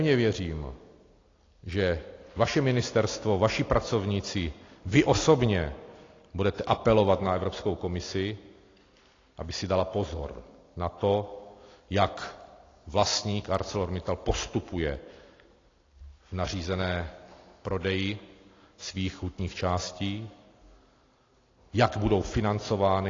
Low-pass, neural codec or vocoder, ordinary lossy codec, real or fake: 7.2 kHz; none; AAC, 32 kbps; real